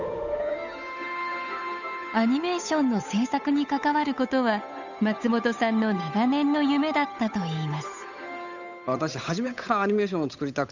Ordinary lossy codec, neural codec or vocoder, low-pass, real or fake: none; codec, 16 kHz, 8 kbps, FunCodec, trained on Chinese and English, 25 frames a second; 7.2 kHz; fake